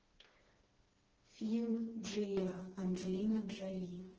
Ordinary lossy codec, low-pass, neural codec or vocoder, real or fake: Opus, 16 kbps; 7.2 kHz; codec, 16 kHz, 2 kbps, FreqCodec, smaller model; fake